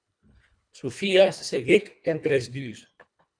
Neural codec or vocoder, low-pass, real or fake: codec, 24 kHz, 1.5 kbps, HILCodec; 9.9 kHz; fake